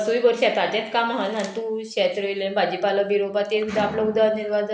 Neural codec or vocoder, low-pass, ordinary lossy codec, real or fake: none; none; none; real